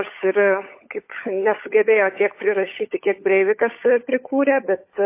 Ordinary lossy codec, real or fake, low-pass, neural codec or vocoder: MP3, 24 kbps; fake; 3.6 kHz; codec, 16 kHz, 16 kbps, FunCodec, trained on LibriTTS, 50 frames a second